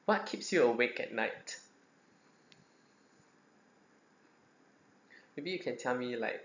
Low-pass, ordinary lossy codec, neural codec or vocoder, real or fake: 7.2 kHz; none; none; real